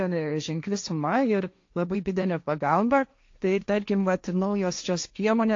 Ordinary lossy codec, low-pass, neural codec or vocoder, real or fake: AAC, 48 kbps; 7.2 kHz; codec, 16 kHz, 1.1 kbps, Voila-Tokenizer; fake